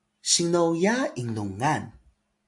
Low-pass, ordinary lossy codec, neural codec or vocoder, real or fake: 10.8 kHz; AAC, 64 kbps; none; real